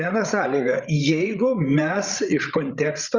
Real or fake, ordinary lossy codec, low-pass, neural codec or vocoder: fake; Opus, 64 kbps; 7.2 kHz; codec, 16 kHz, 16 kbps, FreqCodec, larger model